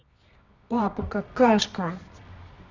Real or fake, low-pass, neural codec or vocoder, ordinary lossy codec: fake; 7.2 kHz; codec, 24 kHz, 0.9 kbps, WavTokenizer, medium music audio release; none